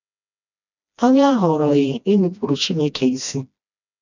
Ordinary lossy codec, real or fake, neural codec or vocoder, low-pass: none; fake; codec, 16 kHz, 1 kbps, FreqCodec, smaller model; 7.2 kHz